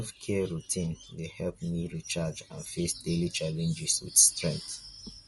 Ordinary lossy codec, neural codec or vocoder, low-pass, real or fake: MP3, 48 kbps; none; 19.8 kHz; real